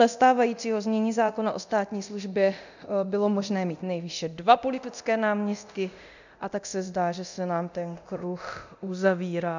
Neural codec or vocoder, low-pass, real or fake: codec, 24 kHz, 0.9 kbps, DualCodec; 7.2 kHz; fake